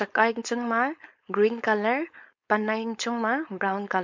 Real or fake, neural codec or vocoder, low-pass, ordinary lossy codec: fake; codec, 16 kHz, 4.8 kbps, FACodec; 7.2 kHz; MP3, 48 kbps